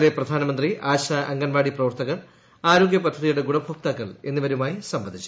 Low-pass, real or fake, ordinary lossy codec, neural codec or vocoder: none; real; none; none